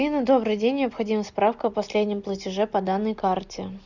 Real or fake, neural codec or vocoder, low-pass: real; none; 7.2 kHz